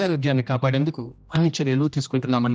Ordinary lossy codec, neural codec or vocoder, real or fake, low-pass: none; codec, 16 kHz, 1 kbps, X-Codec, HuBERT features, trained on general audio; fake; none